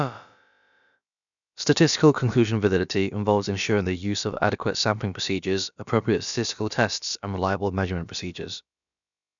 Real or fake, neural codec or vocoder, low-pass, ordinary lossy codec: fake; codec, 16 kHz, about 1 kbps, DyCAST, with the encoder's durations; 7.2 kHz; none